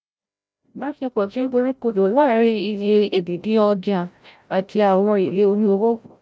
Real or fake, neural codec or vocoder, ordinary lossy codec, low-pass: fake; codec, 16 kHz, 0.5 kbps, FreqCodec, larger model; none; none